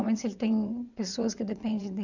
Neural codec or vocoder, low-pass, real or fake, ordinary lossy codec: vocoder, 22.05 kHz, 80 mel bands, WaveNeXt; 7.2 kHz; fake; none